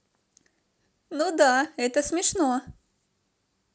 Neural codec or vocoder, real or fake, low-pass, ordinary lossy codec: none; real; none; none